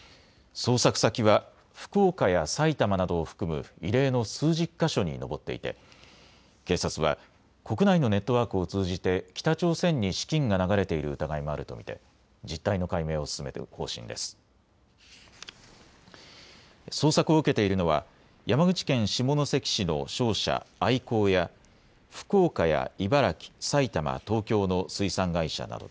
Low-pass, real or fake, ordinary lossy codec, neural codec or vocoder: none; real; none; none